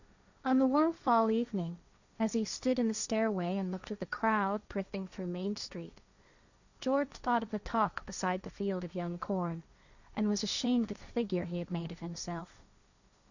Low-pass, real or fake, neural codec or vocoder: 7.2 kHz; fake; codec, 16 kHz, 1.1 kbps, Voila-Tokenizer